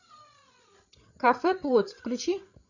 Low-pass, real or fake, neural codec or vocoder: 7.2 kHz; fake; codec, 16 kHz, 8 kbps, FreqCodec, larger model